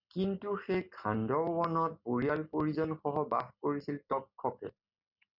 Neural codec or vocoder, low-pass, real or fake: none; 5.4 kHz; real